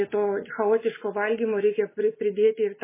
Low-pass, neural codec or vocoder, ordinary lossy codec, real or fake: 3.6 kHz; none; MP3, 16 kbps; real